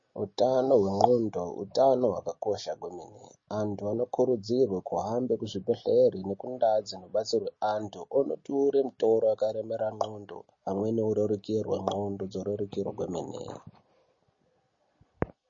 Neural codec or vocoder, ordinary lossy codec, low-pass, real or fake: none; MP3, 32 kbps; 7.2 kHz; real